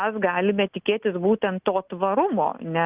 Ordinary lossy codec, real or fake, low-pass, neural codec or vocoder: Opus, 32 kbps; real; 3.6 kHz; none